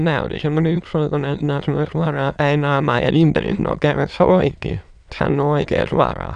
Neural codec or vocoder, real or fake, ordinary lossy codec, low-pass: autoencoder, 22.05 kHz, a latent of 192 numbers a frame, VITS, trained on many speakers; fake; none; 9.9 kHz